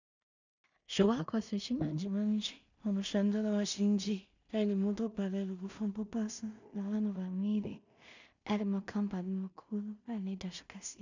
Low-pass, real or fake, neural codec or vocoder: 7.2 kHz; fake; codec, 16 kHz in and 24 kHz out, 0.4 kbps, LongCat-Audio-Codec, two codebook decoder